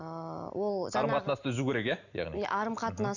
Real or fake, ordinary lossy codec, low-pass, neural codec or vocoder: real; none; 7.2 kHz; none